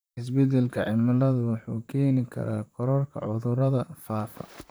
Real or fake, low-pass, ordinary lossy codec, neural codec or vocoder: fake; none; none; vocoder, 44.1 kHz, 128 mel bands, Pupu-Vocoder